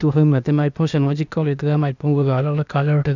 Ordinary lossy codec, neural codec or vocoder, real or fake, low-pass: none; codec, 16 kHz, 0.8 kbps, ZipCodec; fake; 7.2 kHz